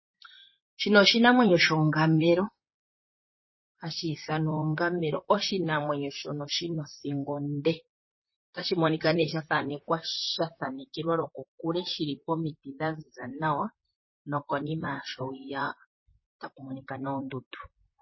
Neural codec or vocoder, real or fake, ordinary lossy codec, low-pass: vocoder, 44.1 kHz, 128 mel bands, Pupu-Vocoder; fake; MP3, 24 kbps; 7.2 kHz